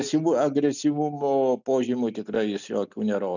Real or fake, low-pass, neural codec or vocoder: real; 7.2 kHz; none